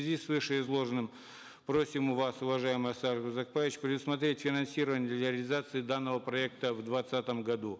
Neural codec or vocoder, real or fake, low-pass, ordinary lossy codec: none; real; none; none